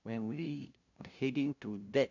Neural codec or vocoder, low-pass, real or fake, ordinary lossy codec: codec, 16 kHz, 0.5 kbps, FunCodec, trained on LibriTTS, 25 frames a second; 7.2 kHz; fake; none